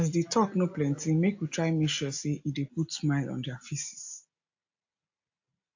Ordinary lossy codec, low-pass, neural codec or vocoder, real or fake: AAC, 48 kbps; 7.2 kHz; none; real